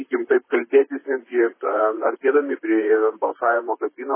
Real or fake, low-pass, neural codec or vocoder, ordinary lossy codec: fake; 3.6 kHz; vocoder, 44.1 kHz, 128 mel bands, Pupu-Vocoder; MP3, 16 kbps